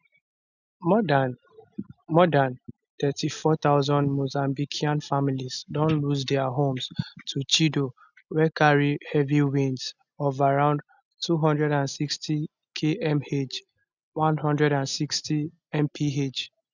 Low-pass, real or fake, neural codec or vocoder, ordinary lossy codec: 7.2 kHz; real; none; none